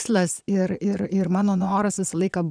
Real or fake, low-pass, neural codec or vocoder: fake; 9.9 kHz; vocoder, 22.05 kHz, 80 mel bands, Vocos